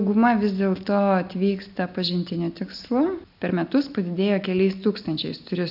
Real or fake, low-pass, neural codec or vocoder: real; 5.4 kHz; none